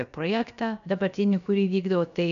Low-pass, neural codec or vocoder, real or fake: 7.2 kHz; codec, 16 kHz, about 1 kbps, DyCAST, with the encoder's durations; fake